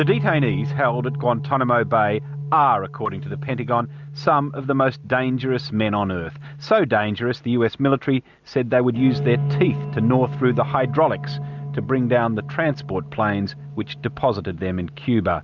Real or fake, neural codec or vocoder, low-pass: real; none; 7.2 kHz